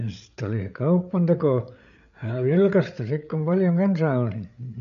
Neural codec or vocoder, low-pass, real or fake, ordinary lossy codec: codec, 16 kHz, 8 kbps, FreqCodec, larger model; 7.2 kHz; fake; none